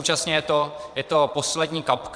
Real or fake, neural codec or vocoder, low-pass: fake; vocoder, 22.05 kHz, 80 mel bands, Vocos; 9.9 kHz